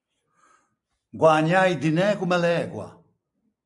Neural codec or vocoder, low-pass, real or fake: vocoder, 44.1 kHz, 128 mel bands every 256 samples, BigVGAN v2; 10.8 kHz; fake